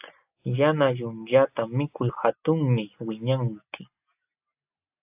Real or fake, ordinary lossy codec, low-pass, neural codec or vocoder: real; AAC, 32 kbps; 3.6 kHz; none